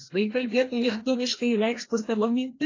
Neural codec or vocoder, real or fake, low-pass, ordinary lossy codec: codec, 16 kHz, 1 kbps, FreqCodec, larger model; fake; 7.2 kHz; AAC, 32 kbps